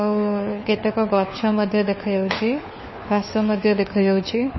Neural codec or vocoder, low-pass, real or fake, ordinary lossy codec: codec, 16 kHz, 4 kbps, FunCodec, trained on LibriTTS, 50 frames a second; 7.2 kHz; fake; MP3, 24 kbps